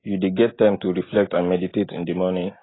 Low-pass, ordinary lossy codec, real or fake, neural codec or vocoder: 7.2 kHz; AAC, 16 kbps; real; none